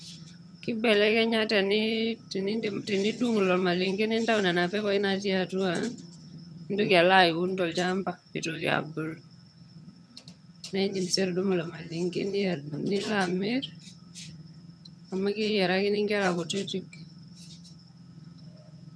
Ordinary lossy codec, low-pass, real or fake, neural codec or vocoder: none; none; fake; vocoder, 22.05 kHz, 80 mel bands, HiFi-GAN